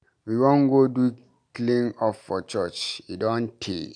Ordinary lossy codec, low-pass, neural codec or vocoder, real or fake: none; 9.9 kHz; none; real